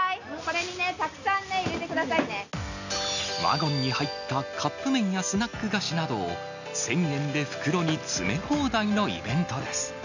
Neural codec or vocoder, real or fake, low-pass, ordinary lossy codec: none; real; 7.2 kHz; none